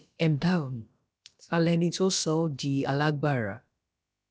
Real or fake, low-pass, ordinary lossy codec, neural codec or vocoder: fake; none; none; codec, 16 kHz, about 1 kbps, DyCAST, with the encoder's durations